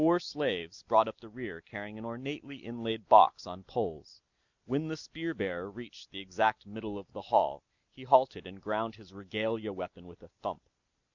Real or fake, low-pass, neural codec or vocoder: real; 7.2 kHz; none